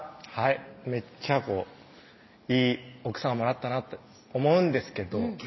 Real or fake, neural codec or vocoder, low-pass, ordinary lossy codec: real; none; 7.2 kHz; MP3, 24 kbps